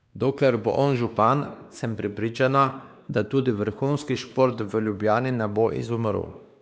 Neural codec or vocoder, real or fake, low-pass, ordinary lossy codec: codec, 16 kHz, 2 kbps, X-Codec, WavLM features, trained on Multilingual LibriSpeech; fake; none; none